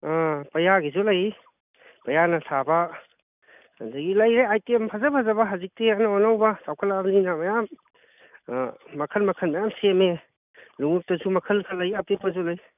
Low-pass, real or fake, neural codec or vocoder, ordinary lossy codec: 3.6 kHz; real; none; none